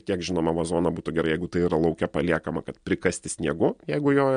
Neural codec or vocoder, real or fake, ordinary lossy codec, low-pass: none; real; MP3, 64 kbps; 9.9 kHz